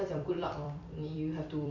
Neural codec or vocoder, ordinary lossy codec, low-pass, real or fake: vocoder, 44.1 kHz, 128 mel bands every 256 samples, BigVGAN v2; none; 7.2 kHz; fake